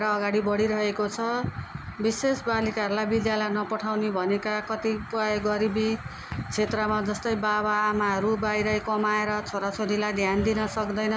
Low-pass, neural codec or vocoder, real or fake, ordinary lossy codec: none; none; real; none